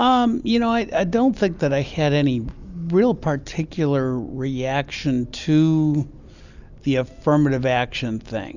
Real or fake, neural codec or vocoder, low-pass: real; none; 7.2 kHz